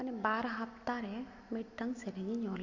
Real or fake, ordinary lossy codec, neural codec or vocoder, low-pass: real; MP3, 48 kbps; none; 7.2 kHz